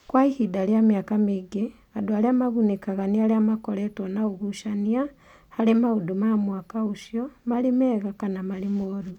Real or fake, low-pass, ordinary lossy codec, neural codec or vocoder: real; 19.8 kHz; none; none